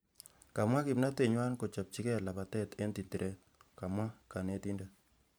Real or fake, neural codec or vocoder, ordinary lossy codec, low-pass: fake; vocoder, 44.1 kHz, 128 mel bands every 512 samples, BigVGAN v2; none; none